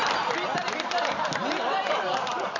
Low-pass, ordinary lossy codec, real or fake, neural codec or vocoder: 7.2 kHz; none; real; none